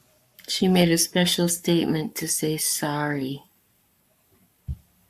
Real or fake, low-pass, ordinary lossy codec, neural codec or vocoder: fake; 14.4 kHz; AAC, 96 kbps; codec, 44.1 kHz, 7.8 kbps, Pupu-Codec